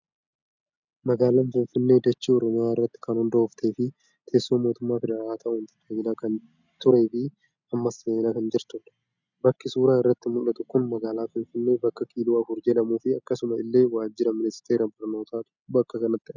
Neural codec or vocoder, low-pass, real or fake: none; 7.2 kHz; real